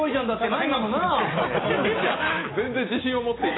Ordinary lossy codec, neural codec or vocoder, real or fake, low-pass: AAC, 16 kbps; none; real; 7.2 kHz